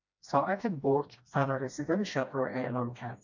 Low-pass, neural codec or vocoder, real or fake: 7.2 kHz; codec, 16 kHz, 1 kbps, FreqCodec, smaller model; fake